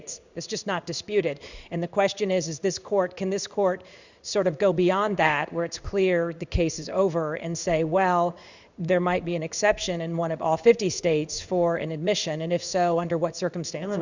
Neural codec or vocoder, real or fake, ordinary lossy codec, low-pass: codec, 16 kHz in and 24 kHz out, 1 kbps, XY-Tokenizer; fake; Opus, 64 kbps; 7.2 kHz